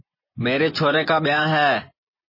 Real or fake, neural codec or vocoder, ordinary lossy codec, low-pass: fake; vocoder, 24 kHz, 100 mel bands, Vocos; MP3, 24 kbps; 5.4 kHz